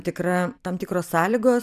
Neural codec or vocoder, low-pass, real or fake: vocoder, 44.1 kHz, 128 mel bands every 256 samples, BigVGAN v2; 14.4 kHz; fake